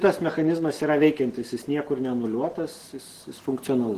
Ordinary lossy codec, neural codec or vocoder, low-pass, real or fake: Opus, 16 kbps; none; 14.4 kHz; real